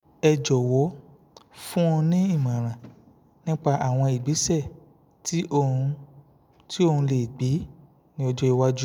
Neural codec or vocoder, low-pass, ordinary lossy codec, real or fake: none; 19.8 kHz; none; real